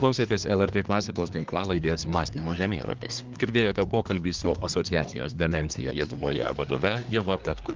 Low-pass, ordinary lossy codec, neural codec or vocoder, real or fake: 7.2 kHz; Opus, 24 kbps; codec, 24 kHz, 1 kbps, SNAC; fake